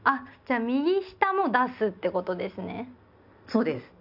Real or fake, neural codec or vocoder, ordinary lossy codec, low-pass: real; none; none; 5.4 kHz